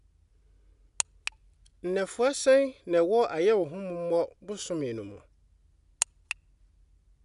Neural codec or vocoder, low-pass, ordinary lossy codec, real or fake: none; 10.8 kHz; none; real